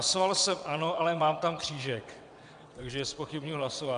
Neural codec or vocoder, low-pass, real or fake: vocoder, 22.05 kHz, 80 mel bands, WaveNeXt; 9.9 kHz; fake